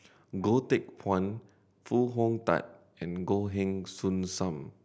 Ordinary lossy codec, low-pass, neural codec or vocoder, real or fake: none; none; none; real